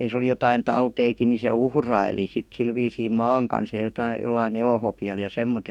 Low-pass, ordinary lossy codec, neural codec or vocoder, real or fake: 19.8 kHz; none; codec, 44.1 kHz, 2.6 kbps, DAC; fake